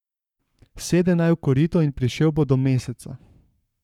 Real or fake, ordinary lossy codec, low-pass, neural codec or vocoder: fake; none; 19.8 kHz; codec, 44.1 kHz, 7.8 kbps, Pupu-Codec